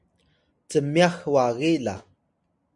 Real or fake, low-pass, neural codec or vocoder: real; 10.8 kHz; none